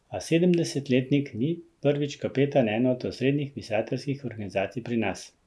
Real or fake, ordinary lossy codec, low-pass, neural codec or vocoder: real; none; none; none